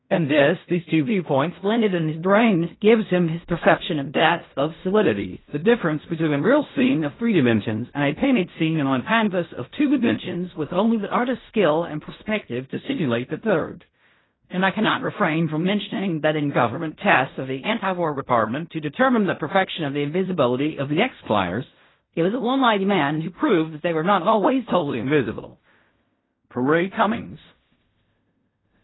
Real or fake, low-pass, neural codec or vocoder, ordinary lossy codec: fake; 7.2 kHz; codec, 16 kHz in and 24 kHz out, 0.4 kbps, LongCat-Audio-Codec, fine tuned four codebook decoder; AAC, 16 kbps